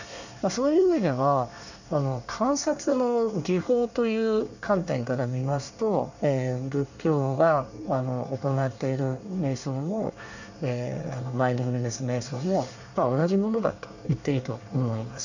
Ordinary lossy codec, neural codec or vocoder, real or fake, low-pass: none; codec, 24 kHz, 1 kbps, SNAC; fake; 7.2 kHz